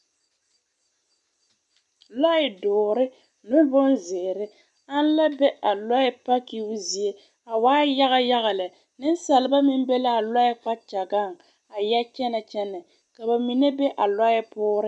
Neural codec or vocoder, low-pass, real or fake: none; 10.8 kHz; real